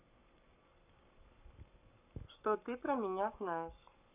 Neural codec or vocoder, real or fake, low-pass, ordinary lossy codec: codec, 44.1 kHz, 7.8 kbps, Pupu-Codec; fake; 3.6 kHz; none